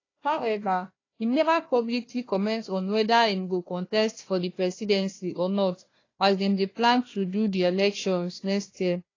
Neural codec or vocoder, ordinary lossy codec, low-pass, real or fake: codec, 16 kHz, 1 kbps, FunCodec, trained on Chinese and English, 50 frames a second; AAC, 32 kbps; 7.2 kHz; fake